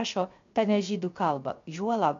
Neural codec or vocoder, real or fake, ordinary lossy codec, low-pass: codec, 16 kHz, 0.7 kbps, FocalCodec; fake; MP3, 48 kbps; 7.2 kHz